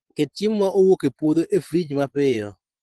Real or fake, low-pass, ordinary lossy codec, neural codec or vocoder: fake; 10.8 kHz; Opus, 16 kbps; vocoder, 24 kHz, 100 mel bands, Vocos